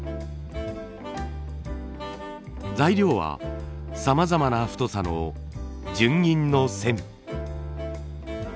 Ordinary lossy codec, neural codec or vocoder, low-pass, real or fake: none; none; none; real